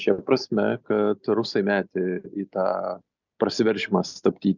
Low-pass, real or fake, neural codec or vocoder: 7.2 kHz; real; none